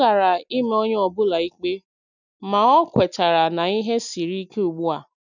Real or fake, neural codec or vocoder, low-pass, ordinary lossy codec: real; none; 7.2 kHz; none